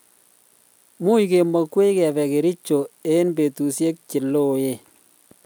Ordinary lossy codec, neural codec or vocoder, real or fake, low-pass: none; none; real; none